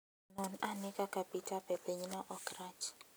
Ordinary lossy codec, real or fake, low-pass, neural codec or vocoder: none; real; none; none